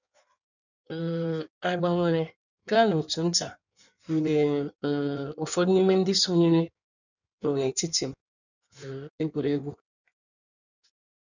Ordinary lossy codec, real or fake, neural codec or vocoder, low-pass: none; fake; codec, 16 kHz in and 24 kHz out, 1.1 kbps, FireRedTTS-2 codec; 7.2 kHz